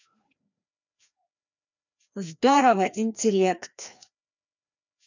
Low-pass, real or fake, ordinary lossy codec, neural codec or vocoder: 7.2 kHz; fake; none; codec, 16 kHz, 1 kbps, FreqCodec, larger model